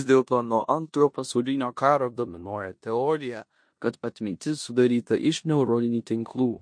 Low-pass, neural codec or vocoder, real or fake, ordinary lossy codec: 9.9 kHz; codec, 16 kHz in and 24 kHz out, 0.9 kbps, LongCat-Audio-Codec, four codebook decoder; fake; MP3, 48 kbps